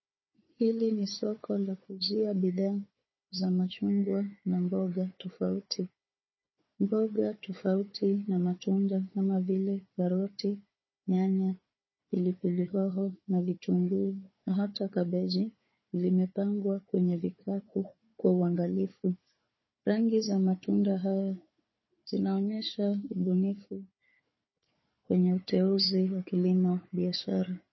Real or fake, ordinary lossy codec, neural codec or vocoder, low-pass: fake; MP3, 24 kbps; codec, 16 kHz, 4 kbps, FunCodec, trained on Chinese and English, 50 frames a second; 7.2 kHz